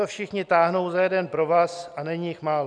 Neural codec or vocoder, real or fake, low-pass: none; real; 9.9 kHz